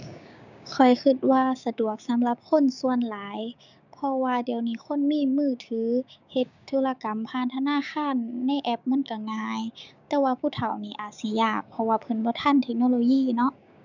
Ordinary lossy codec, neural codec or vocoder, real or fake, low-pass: none; codec, 16 kHz, 6 kbps, DAC; fake; 7.2 kHz